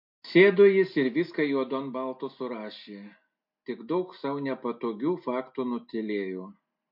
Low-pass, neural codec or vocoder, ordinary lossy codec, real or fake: 5.4 kHz; none; MP3, 48 kbps; real